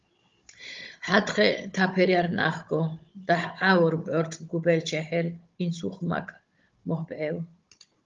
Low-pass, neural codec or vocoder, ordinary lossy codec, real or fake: 7.2 kHz; codec, 16 kHz, 16 kbps, FunCodec, trained on Chinese and English, 50 frames a second; Opus, 32 kbps; fake